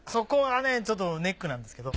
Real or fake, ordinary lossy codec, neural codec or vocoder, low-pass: real; none; none; none